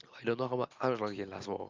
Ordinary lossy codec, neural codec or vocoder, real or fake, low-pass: Opus, 24 kbps; none; real; 7.2 kHz